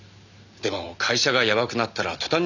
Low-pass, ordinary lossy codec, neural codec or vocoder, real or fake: 7.2 kHz; none; none; real